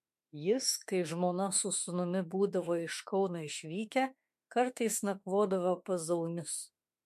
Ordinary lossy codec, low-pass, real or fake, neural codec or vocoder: MP3, 64 kbps; 14.4 kHz; fake; autoencoder, 48 kHz, 32 numbers a frame, DAC-VAE, trained on Japanese speech